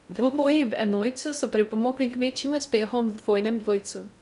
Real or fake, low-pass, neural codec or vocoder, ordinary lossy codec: fake; 10.8 kHz; codec, 16 kHz in and 24 kHz out, 0.6 kbps, FocalCodec, streaming, 4096 codes; none